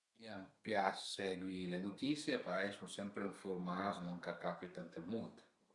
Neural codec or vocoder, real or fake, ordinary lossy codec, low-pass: codec, 32 kHz, 1.9 kbps, SNAC; fake; Opus, 64 kbps; 10.8 kHz